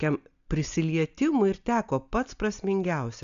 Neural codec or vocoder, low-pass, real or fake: none; 7.2 kHz; real